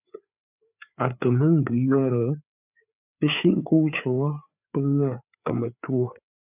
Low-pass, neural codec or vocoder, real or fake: 3.6 kHz; codec, 16 kHz, 4 kbps, FreqCodec, larger model; fake